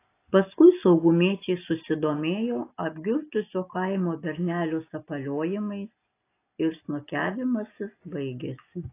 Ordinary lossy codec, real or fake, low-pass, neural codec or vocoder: AAC, 24 kbps; real; 3.6 kHz; none